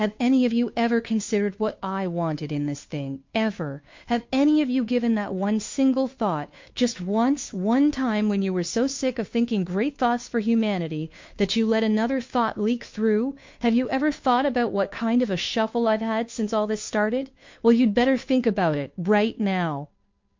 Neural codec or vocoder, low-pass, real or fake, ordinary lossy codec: codec, 16 kHz, 2 kbps, FunCodec, trained on Chinese and English, 25 frames a second; 7.2 kHz; fake; MP3, 48 kbps